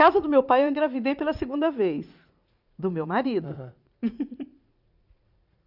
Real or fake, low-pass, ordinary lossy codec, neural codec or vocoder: fake; 5.4 kHz; none; vocoder, 44.1 kHz, 80 mel bands, Vocos